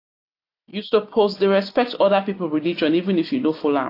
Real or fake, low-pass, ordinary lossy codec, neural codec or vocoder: real; 5.4 kHz; AAC, 32 kbps; none